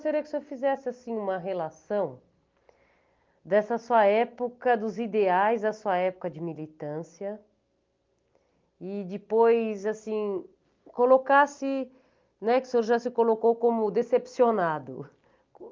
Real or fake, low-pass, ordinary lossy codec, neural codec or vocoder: real; 7.2 kHz; Opus, 32 kbps; none